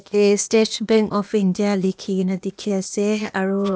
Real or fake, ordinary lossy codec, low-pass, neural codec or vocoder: fake; none; none; codec, 16 kHz, 0.8 kbps, ZipCodec